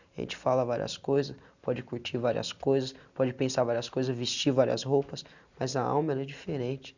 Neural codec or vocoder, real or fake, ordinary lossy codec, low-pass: none; real; none; 7.2 kHz